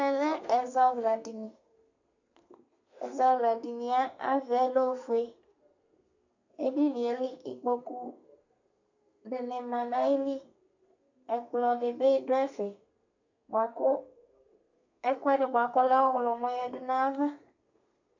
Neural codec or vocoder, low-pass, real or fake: codec, 32 kHz, 1.9 kbps, SNAC; 7.2 kHz; fake